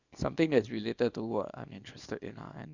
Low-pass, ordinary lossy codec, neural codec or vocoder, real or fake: 7.2 kHz; Opus, 64 kbps; codec, 24 kHz, 0.9 kbps, WavTokenizer, small release; fake